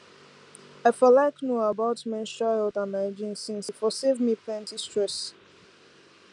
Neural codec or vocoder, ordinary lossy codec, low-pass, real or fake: none; none; 10.8 kHz; real